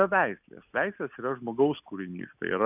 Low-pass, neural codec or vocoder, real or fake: 3.6 kHz; none; real